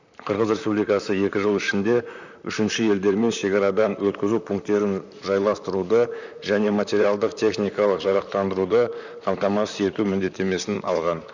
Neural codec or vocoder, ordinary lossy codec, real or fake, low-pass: vocoder, 44.1 kHz, 128 mel bands, Pupu-Vocoder; none; fake; 7.2 kHz